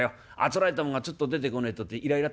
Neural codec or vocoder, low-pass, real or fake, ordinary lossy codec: none; none; real; none